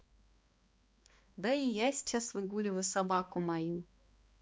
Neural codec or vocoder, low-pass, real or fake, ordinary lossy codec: codec, 16 kHz, 2 kbps, X-Codec, HuBERT features, trained on balanced general audio; none; fake; none